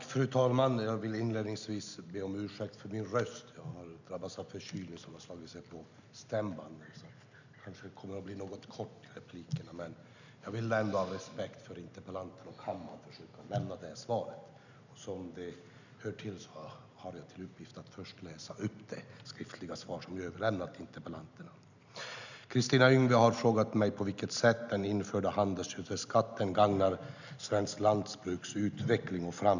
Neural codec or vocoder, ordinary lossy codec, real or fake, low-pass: none; none; real; 7.2 kHz